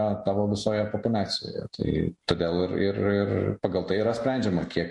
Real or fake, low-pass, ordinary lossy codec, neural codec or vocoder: real; 10.8 kHz; MP3, 48 kbps; none